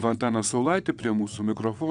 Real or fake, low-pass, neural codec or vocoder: fake; 9.9 kHz; vocoder, 22.05 kHz, 80 mel bands, WaveNeXt